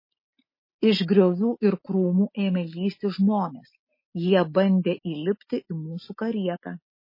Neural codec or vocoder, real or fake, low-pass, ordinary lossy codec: none; real; 5.4 kHz; MP3, 24 kbps